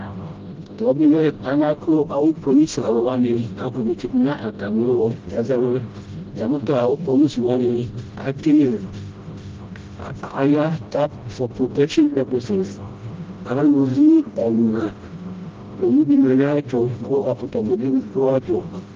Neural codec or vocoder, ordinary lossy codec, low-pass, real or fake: codec, 16 kHz, 0.5 kbps, FreqCodec, smaller model; Opus, 32 kbps; 7.2 kHz; fake